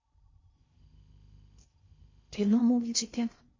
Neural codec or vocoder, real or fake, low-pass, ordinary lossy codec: codec, 16 kHz in and 24 kHz out, 0.6 kbps, FocalCodec, streaming, 4096 codes; fake; 7.2 kHz; MP3, 32 kbps